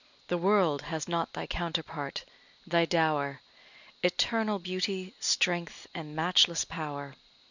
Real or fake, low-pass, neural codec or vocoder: real; 7.2 kHz; none